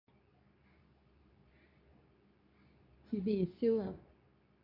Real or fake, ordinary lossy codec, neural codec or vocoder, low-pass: fake; none; codec, 24 kHz, 0.9 kbps, WavTokenizer, medium speech release version 2; 5.4 kHz